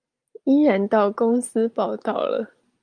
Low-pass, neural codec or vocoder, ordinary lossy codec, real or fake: 9.9 kHz; none; Opus, 32 kbps; real